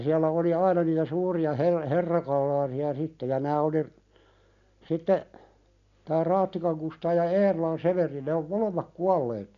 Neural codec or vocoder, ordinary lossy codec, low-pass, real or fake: none; none; 7.2 kHz; real